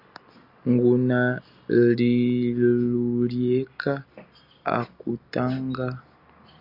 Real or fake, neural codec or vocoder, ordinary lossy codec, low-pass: real; none; Opus, 64 kbps; 5.4 kHz